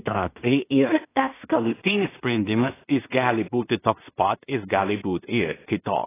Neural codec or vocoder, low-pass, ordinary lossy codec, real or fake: codec, 16 kHz in and 24 kHz out, 0.4 kbps, LongCat-Audio-Codec, two codebook decoder; 3.6 kHz; AAC, 16 kbps; fake